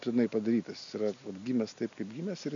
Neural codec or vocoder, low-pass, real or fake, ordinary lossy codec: none; 7.2 kHz; real; AAC, 64 kbps